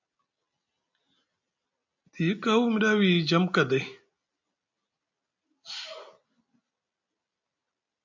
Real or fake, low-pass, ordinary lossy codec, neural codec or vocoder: real; 7.2 kHz; MP3, 48 kbps; none